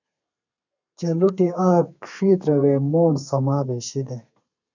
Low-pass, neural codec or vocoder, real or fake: 7.2 kHz; codec, 32 kHz, 1.9 kbps, SNAC; fake